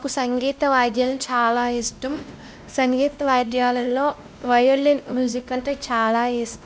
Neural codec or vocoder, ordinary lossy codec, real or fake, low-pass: codec, 16 kHz, 1 kbps, X-Codec, WavLM features, trained on Multilingual LibriSpeech; none; fake; none